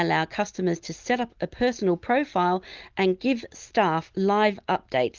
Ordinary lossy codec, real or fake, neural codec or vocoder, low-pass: Opus, 32 kbps; real; none; 7.2 kHz